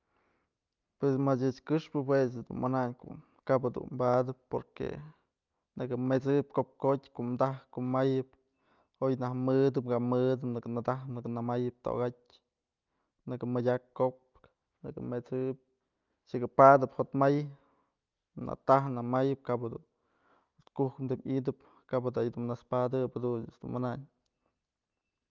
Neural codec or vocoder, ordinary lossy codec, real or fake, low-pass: none; Opus, 24 kbps; real; 7.2 kHz